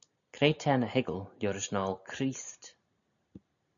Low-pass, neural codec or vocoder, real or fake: 7.2 kHz; none; real